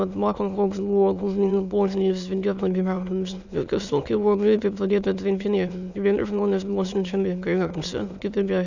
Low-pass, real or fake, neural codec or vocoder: 7.2 kHz; fake; autoencoder, 22.05 kHz, a latent of 192 numbers a frame, VITS, trained on many speakers